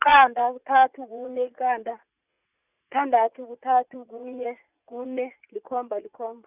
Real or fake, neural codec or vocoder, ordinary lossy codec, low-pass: fake; vocoder, 44.1 kHz, 80 mel bands, Vocos; Opus, 64 kbps; 3.6 kHz